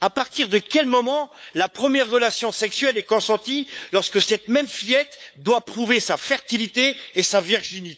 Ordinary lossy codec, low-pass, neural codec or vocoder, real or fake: none; none; codec, 16 kHz, 8 kbps, FunCodec, trained on LibriTTS, 25 frames a second; fake